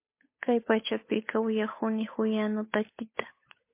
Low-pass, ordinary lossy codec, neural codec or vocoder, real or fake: 3.6 kHz; MP3, 24 kbps; codec, 16 kHz, 8 kbps, FunCodec, trained on Chinese and English, 25 frames a second; fake